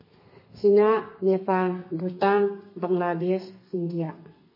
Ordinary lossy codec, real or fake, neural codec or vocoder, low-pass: MP3, 24 kbps; fake; codec, 32 kHz, 1.9 kbps, SNAC; 5.4 kHz